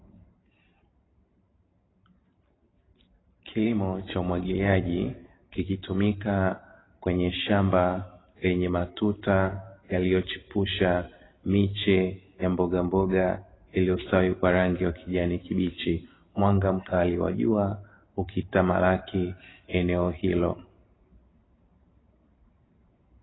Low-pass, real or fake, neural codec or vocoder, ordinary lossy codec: 7.2 kHz; fake; vocoder, 44.1 kHz, 128 mel bands every 512 samples, BigVGAN v2; AAC, 16 kbps